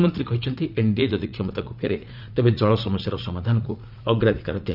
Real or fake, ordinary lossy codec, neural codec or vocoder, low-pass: fake; none; vocoder, 44.1 kHz, 80 mel bands, Vocos; 5.4 kHz